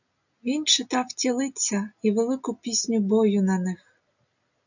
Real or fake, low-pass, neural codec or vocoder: real; 7.2 kHz; none